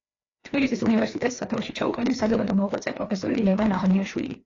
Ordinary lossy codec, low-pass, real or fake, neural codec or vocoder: AAC, 32 kbps; 7.2 kHz; fake; codec, 16 kHz, 2 kbps, FreqCodec, larger model